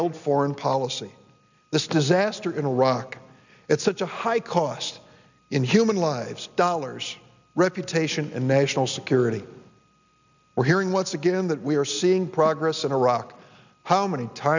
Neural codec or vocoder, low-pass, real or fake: none; 7.2 kHz; real